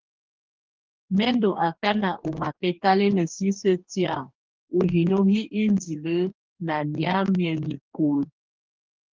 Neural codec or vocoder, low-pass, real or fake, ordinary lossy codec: codec, 44.1 kHz, 2.6 kbps, DAC; 7.2 kHz; fake; Opus, 32 kbps